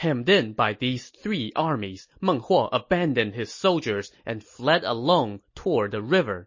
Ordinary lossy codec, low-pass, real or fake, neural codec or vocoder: MP3, 32 kbps; 7.2 kHz; real; none